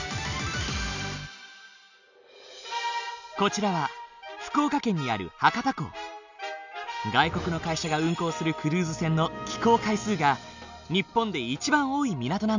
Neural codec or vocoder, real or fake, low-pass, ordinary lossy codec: none; real; 7.2 kHz; none